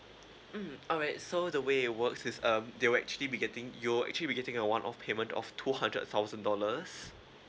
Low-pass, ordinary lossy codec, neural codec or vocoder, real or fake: none; none; none; real